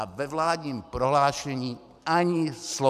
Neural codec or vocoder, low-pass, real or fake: vocoder, 44.1 kHz, 128 mel bands every 512 samples, BigVGAN v2; 14.4 kHz; fake